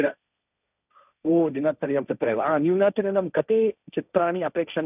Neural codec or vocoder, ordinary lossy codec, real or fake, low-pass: codec, 16 kHz, 1.1 kbps, Voila-Tokenizer; none; fake; 3.6 kHz